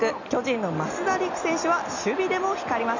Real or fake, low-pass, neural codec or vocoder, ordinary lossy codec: real; 7.2 kHz; none; none